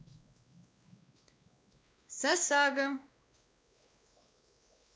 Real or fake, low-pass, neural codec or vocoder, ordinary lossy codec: fake; none; codec, 16 kHz, 2 kbps, X-Codec, WavLM features, trained on Multilingual LibriSpeech; none